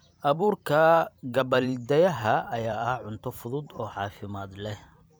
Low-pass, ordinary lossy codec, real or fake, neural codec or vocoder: none; none; fake; vocoder, 44.1 kHz, 128 mel bands every 512 samples, BigVGAN v2